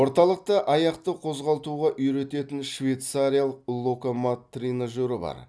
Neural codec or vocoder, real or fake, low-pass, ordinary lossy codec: none; real; none; none